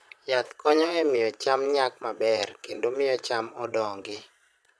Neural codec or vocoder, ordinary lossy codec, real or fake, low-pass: vocoder, 22.05 kHz, 80 mel bands, Vocos; none; fake; none